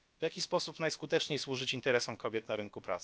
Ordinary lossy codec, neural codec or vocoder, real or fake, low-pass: none; codec, 16 kHz, about 1 kbps, DyCAST, with the encoder's durations; fake; none